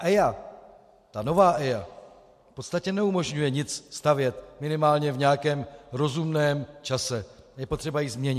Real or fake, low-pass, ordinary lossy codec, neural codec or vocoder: real; 14.4 kHz; MP3, 64 kbps; none